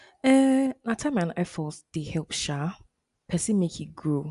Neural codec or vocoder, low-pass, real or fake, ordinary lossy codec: none; 10.8 kHz; real; none